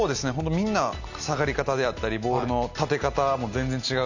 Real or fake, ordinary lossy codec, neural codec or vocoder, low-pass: real; none; none; 7.2 kHz